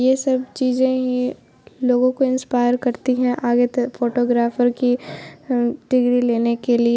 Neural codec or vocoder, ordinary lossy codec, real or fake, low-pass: none; none; real; none